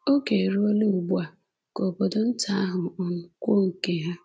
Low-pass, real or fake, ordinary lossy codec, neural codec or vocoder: none; real; none; none